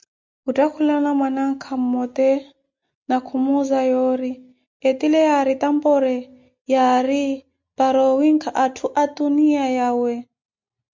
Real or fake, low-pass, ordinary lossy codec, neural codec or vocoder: real; 7.2 kHz; MP3, 64 kbps; none